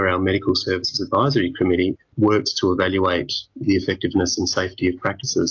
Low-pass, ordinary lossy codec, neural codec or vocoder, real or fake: 7.2 kHz; AAC, 48 kbps; none; real